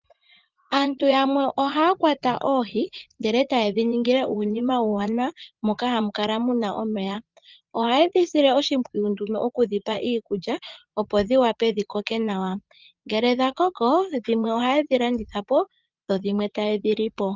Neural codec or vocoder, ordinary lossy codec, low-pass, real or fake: vocoder, 44.1 kHz, 80 mel bands, Vocos; Opus, 32 kbps; 7.2 kHz; fake